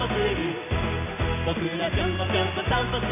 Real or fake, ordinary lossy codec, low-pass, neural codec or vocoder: real; none; 3.6 kHz; none